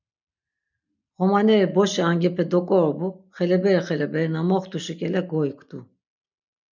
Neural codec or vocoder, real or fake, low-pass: none; real; 7.2 kHz